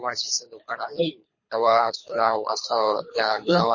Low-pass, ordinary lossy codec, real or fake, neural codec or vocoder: 7.2 kHz; MP3, 32 kbps; fake; codec, 24 kHz, 3 kbps, HILCodec